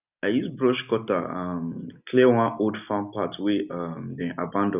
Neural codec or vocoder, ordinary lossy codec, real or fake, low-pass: none; none; real; 3.6 kHz